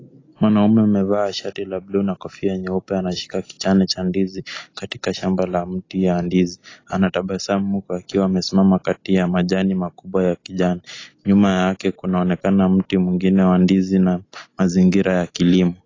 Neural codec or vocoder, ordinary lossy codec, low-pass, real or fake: none; AAC, 32 kbps; 7.2 kHz; real